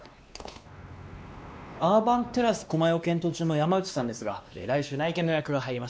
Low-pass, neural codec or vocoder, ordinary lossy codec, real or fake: none; codec, 16 kHz, 2 kbps, X-Codec, WavLM features, trained on Multilingual LibriSpeech; none; fake